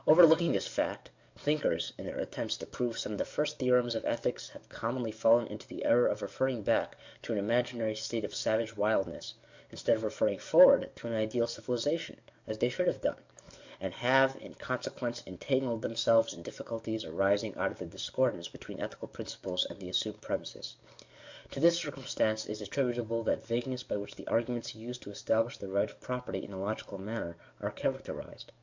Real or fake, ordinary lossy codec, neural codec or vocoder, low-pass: fake; MP3, 64 kbps; codec, 44.1 kHz, 7.8 kbps, DAC; 7.2 kHz